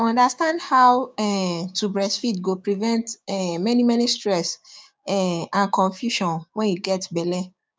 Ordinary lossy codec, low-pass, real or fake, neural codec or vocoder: none; none; fake; codec, 16 kHz, 6 kbps, DAC